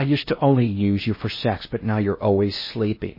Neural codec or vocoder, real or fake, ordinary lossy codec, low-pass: codec, 16 kHz in and 24 kHz out, 0.6 kbps, FocalCodec, streaming, 4096 codes; fake; MP3, 24 kbps; 5.4 kHz